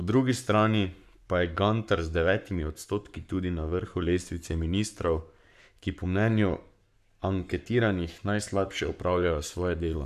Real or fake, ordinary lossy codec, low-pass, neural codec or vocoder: fake; none; 14.4 kHz; codec, 44.1 kHz, 7.8 kbps, Pupu-Codec